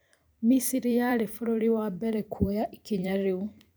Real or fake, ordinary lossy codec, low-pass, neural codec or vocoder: fake; none; none; vocoder, 44.1 kHz, 128 mel bands every 512 samples, BigVGAN v2